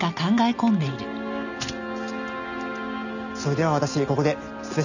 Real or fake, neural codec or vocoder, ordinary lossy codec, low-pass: real; none; none; 7.2 kHz